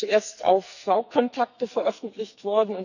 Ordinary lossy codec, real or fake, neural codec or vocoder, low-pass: none; fake; codec, 44.1 kHz, 2.6 kbps, SNAC; 7.2 kHz